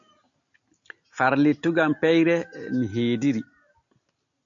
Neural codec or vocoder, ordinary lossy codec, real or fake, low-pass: none; AAC, 64 kbps; real; 7.2 kHz